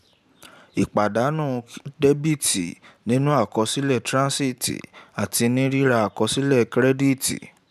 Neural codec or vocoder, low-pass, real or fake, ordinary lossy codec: vocoder, 44.1 kHz, 128 mel bands every 512 samples, BigVGAN v2; 14.4 kHz; fake; none